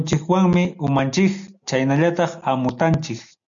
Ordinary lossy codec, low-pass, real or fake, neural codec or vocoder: MP3, 64 kbps; 7.2 kHz; real; none